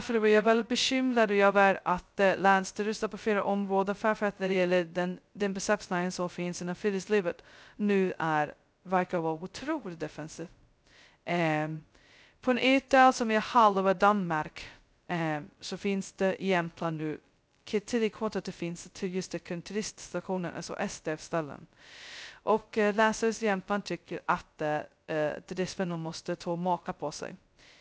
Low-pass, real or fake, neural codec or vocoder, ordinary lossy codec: none; fake; codec, 16 kHz, 0.2 kbps, FocalCodec; none